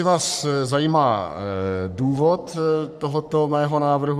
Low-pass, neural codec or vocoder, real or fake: 14.4 kHz; codec, 44.1 kHz, 3.4 kbps, Pupu-Codec; fake